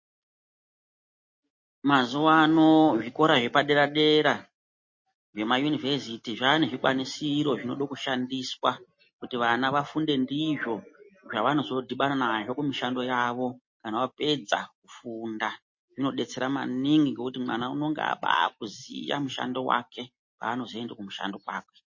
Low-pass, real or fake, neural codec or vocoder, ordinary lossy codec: 7.2 kHz; real; none; MP3, 32 kbps